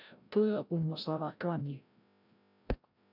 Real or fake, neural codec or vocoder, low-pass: fake; codec, 16 kHz, 0.5 kbps, FreqCodec, larger model; 5.4 kHz